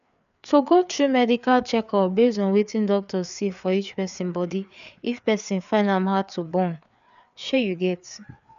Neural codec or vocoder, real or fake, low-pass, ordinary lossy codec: codec, 16 kHz, 4 kbps, FreqCodec, larger model; fake; 7.2 kHz; none